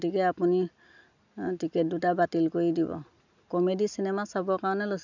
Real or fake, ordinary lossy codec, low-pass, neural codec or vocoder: real; none; 7.2 kHz; none